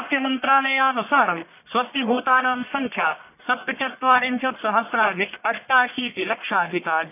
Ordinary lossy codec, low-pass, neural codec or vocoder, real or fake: none; 3.6 kHz; codec, 44.1 kHz, 1.7 kbps, Pupu-Codec; fake